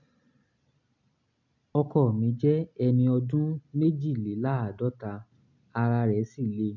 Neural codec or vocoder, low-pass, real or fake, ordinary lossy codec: none; 7.2 kHz; real; none